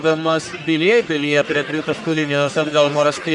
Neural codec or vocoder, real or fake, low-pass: codec, 44.1 kHz, 1.7 kbps, Pupu-Codec; fake; 10.8 kHz